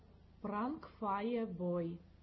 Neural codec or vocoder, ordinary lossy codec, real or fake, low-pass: none; MP3, 24 kbps; real; 7.2 kHz